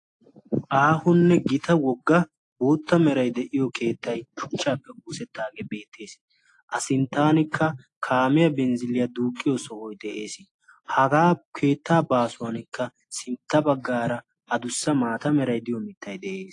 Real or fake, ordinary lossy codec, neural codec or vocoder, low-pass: real; AAC, 48 kbps; none; 10.8 kHz